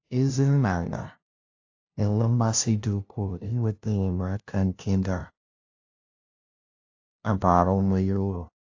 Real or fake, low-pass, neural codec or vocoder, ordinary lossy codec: fake; 7.2 kHz; codec, 16 kHz, 0.5 kbps, FunCodec, trained on LibriTTS, 25 frames a second; AAC, 48 kbps